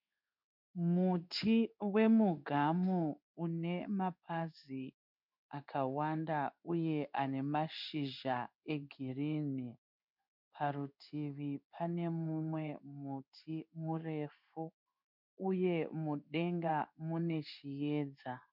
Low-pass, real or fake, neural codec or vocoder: 5.4 kHz; fake; codec, 16 kHz in and 24 kHz out, 1 kbps, XY-Tokenizer